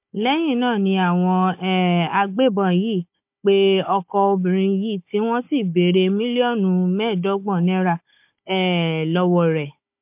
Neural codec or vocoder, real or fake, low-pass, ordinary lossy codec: codec, 16 kHz, 16 kbps, FunCodec, trained on Chinese and English, 50 frames a second; fake; 3.6 kHz; MP3, 32 kbps